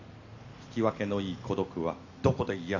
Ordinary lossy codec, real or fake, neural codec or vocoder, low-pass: none; real; none; 7.2 kHz